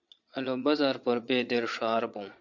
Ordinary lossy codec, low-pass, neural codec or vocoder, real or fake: MP3, 64 kbps; 7.2 kHz; vocoder, 22.05 kHz, 80 mel bands, Vocos; fake